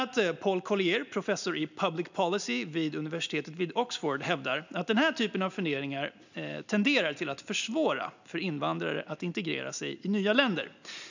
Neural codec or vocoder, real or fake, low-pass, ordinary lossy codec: none; real; 7.2 kHz; none